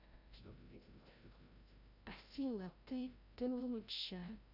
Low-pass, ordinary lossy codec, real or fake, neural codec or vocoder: 5.4 kHz; none; fake; codec, 16 kHz, 0.5 kbps, FreqCodec, larger model